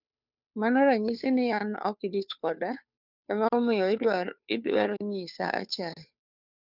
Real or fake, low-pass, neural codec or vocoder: fake; 5.4 kHz; codec, 16 kHz, 2 kbps, FunCodec, trained on Chinese and English, 25 frames a second